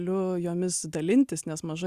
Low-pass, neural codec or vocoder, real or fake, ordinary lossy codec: 14.4 kHz; none; real; Opus, 64 kbps